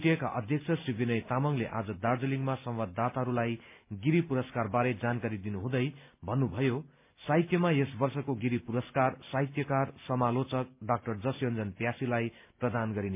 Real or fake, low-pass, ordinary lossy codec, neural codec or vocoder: real; 3.6 kHz; MP3, 32 kbps; none